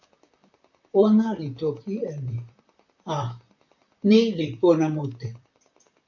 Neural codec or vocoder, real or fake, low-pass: vocoder, 44.1 kHz, 128 mel bands, Pupu-Vocoder; fake; 7.2 kHz